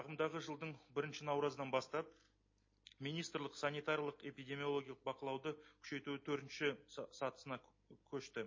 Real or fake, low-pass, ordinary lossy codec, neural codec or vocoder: real; 7.2 kHz; MP3, 32 kbps; none